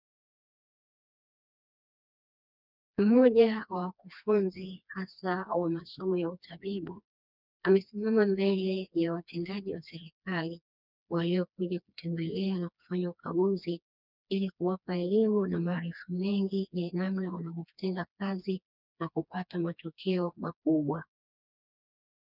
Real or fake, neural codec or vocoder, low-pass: fake; codec, 16 kHz, 2 kbps, FreqCodec, smaller model; 5.4 kHz